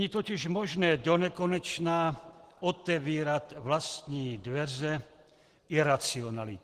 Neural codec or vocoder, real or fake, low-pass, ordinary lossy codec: none; real; 14.4 kHz; Opus, 16 kbps